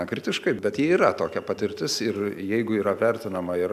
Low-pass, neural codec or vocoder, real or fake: 14.4 kHz; none; real